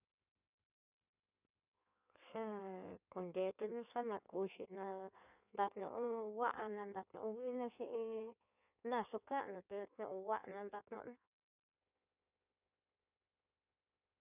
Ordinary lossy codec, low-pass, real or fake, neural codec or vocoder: none; 3.6 kHz; fake; codec, 16 kHz in and 24 kHz out, 1.1 kbps, FireRedTTS-2 codec